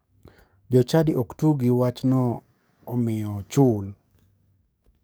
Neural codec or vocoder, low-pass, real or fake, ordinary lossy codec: codec, 44.1 kHz, 7.8 kbps, DAC; none; fake; none